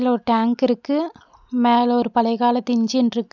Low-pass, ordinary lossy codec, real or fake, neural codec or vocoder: 7.2 kHz; none; real; none